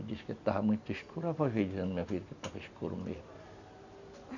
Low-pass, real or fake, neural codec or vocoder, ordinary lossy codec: 7.2 kHz; real; none; none